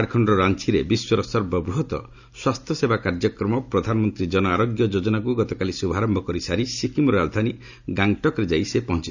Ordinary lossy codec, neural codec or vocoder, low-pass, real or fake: none; none; 7.2 kHz; real